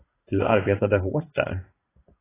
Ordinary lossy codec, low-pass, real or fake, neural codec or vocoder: AAC, 16 kbps; 3.6 kHz; fake; vocoder, 44.1 kHz, 80 mel bands, Vocos